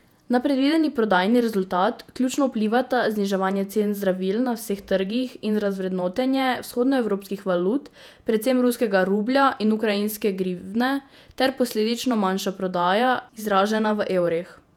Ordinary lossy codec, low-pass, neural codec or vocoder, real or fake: none; 19.8 kHz; vocoder, 48 kHz, 128 mel bands, Vocos; fake